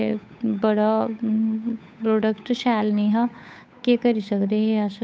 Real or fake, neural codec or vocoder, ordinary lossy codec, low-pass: fake; codec, 16 kHz, 8 kbps, FunCodec, trained on Chinese and English, 25 frames a second; none; none